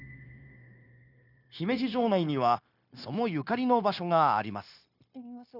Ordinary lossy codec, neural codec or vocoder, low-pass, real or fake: none; codec, 16 kHz, 0.9 kbps, LongCat-Audio-Codec; 5.4 kHz; fake